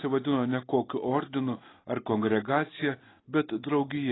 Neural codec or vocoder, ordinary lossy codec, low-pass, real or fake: none; AAC, 16 kbps; 7.2 kHz; real